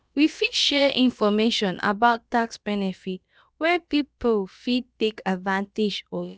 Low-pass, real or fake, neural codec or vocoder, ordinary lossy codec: none; fake; codec, 16 kHz, about 1 kbps, DyCAST, with the encoder's durations; none